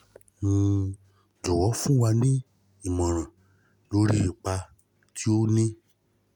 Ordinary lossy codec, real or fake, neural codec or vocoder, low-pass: none; real; none; 19.8 kHz